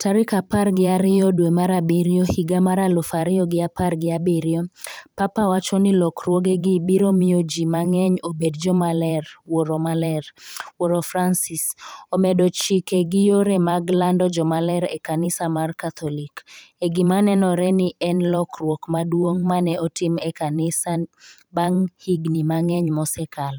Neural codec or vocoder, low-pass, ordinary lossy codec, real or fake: vocoder, 44.1 kHz, 128 mel bands every 512 samples, BigVGAN v2; none; none; fake